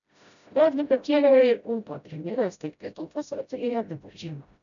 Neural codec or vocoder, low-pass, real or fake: codec, 16 kHz, 0.5 kbps, FreqCodec, smaller model; 7.2 kHz; fake